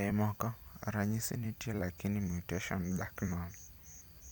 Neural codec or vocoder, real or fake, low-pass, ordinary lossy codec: vocoder, 44.1 kHz, 128 mel bands every 256 samples, BigVGAN v2; fake; none; none